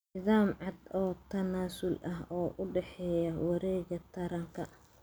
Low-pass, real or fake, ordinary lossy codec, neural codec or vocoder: none; real; none; none